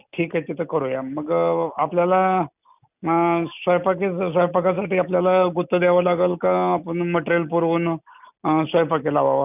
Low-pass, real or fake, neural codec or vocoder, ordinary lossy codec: 3.6 kHz; real; none; none